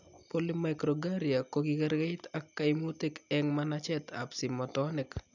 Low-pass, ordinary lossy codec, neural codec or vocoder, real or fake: 7.2 kHz; none; none; real